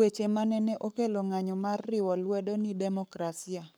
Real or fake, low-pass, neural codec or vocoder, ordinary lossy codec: fake; none; codec, 44.1 kHz, 7.8 kbps, Pupu-Codec; none